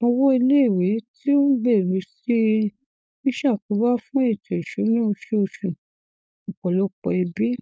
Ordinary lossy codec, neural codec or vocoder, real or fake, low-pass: none; codec, 16 kHz, 4.8 kbps, FACodec; fake; none